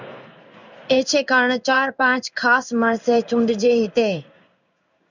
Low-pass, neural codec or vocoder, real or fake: 7.2 kHz; codec, 16 kHz in and 24 kHz out, 1 kbps, XY-Tokenizer; fake